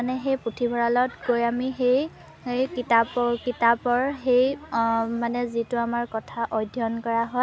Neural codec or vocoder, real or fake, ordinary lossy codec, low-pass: none; real; none; none